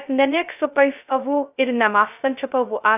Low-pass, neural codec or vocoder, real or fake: 3.6 kHz; codec, 16 kHz, 0.2 kbps, FocalCodec; fake